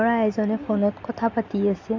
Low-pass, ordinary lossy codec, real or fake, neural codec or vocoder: 7.2 kHz; AAC, 48 kbps; real; none